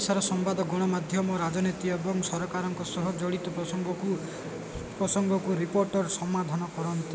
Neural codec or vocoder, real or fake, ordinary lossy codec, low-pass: none; real; none; none